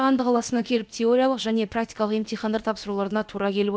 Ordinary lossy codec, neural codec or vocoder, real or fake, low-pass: none; codec, 16 kHz, about 1 kbps, DyCAST, with the encoder's durations; fake; none